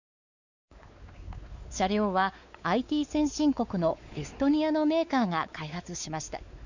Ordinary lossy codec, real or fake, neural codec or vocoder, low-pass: none; fake; codec, 16 kHz, 4 kbps, X-Codec, WavLM features, trained on Multilingual LibriSpeech; 7.2 kHz